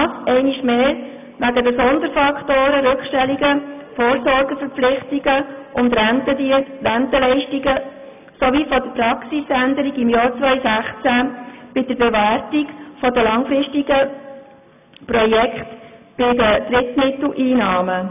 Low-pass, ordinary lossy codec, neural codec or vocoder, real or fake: 3.6 kHz; none; none; real